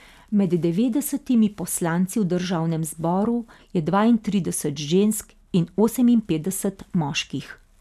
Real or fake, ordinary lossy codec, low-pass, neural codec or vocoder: real; none; 14.4 kHz; none